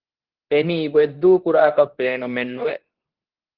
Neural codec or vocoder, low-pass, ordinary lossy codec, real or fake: codec, 24 kHz, 0.9 kbps, WavTokenizer, medium speech release version 2; 5.4 kHz; Opus, 16 kbps; fake